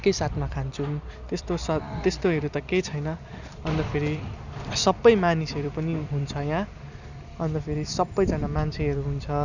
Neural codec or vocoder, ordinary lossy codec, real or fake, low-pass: vocoder, 44.1 kHz, 128 mel bands every 256 samples, BigVGAN v2; none; fake; 7.2 kHz